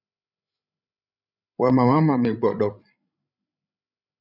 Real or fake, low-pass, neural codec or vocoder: fake; 5.4 kHz; codec, 16 kHz, 16 kbps, FreqCodec, larger model